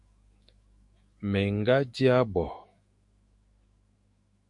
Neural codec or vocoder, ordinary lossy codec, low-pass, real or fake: autoencoder, 48 kHz, 128 numbers a frame, DAC-VAE, trained on Japanese speech; MP3, 48 kbps; 10.8 kHz; fake